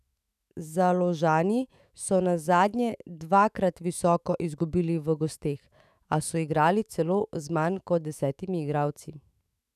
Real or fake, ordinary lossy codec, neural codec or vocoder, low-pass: fake; none; autoencoder, 48 kHz, 128 numbers a frame, DAC-VAE, trained on Japanese speech; 14.4 kHz